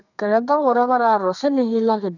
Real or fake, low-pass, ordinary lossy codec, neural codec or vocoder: fake; 7.2 kHz; none; codec, 32 kHz, 1.9 kbps, SNAC